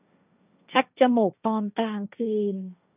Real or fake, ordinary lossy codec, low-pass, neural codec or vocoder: fake; none; 3.6 kHz; codec, 16 kHz, 1.1 kbps, Voila-Tokenizer